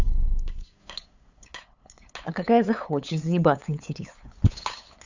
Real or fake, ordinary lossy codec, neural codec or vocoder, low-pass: fake; Opus, 64 kbps; codec, 16 kHz, 8 kbps, FunCodec, trained on LibriTTS, 25 frames a second; 7.2 kHz